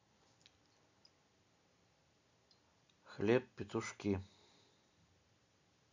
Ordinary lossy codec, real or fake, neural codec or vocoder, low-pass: MP3, 48 kbps; real; none; 7.2 kHz